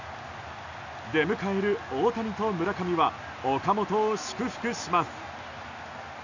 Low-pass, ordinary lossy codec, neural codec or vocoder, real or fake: 7.2 kHz; none; none; real